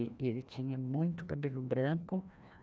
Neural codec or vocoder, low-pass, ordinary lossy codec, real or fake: codec, 16 kHz, 1 kbps, FreqCodec, larger model; none; none; fake